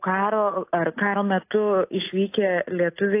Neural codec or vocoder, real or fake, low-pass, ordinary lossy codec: none; real; 3.6 kHz; AAC, 24 kbps